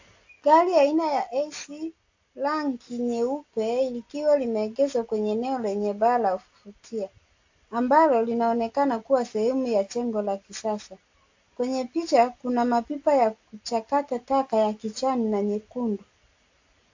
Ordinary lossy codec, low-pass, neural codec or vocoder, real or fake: AAC, 48 kbps; 7.2 kHz; none; real